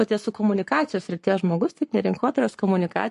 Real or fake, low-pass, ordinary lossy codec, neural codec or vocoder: fake; 14.4 kHz; MP3, 48 kbps; codec, 44.1 kHz, 7.8 kbps, Pupu-Codec